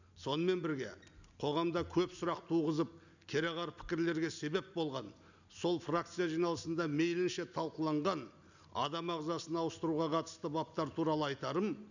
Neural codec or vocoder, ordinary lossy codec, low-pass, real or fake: none; none; 7.2 kHz; real